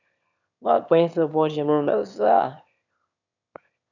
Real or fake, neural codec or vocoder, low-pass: fake; autoencoder, 22.05 kHz, a latent of 192 numbers a frame, VITS, trained on one speaker; 7.2 kHz